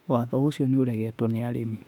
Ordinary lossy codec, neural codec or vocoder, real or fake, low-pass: none; autoencoder, 48 kHz, 32 numbers a frame, DAC-VAE, trained on Japanese speech; fake; 19.8 kHz